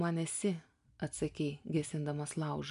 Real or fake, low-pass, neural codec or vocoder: real; 10.8 kHz; none